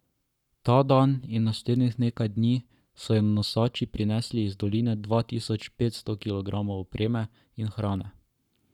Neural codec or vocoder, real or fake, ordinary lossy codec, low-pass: codec, 44.1 kHz, 7.8 kbps, Pupu-Codec; fake; none; 19.8 kHz